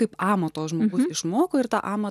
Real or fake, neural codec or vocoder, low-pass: real; none; 14.4 kHz